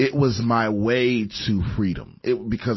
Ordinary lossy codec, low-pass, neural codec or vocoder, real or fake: MP3, 24 kbps; 7.2 kHz; none; real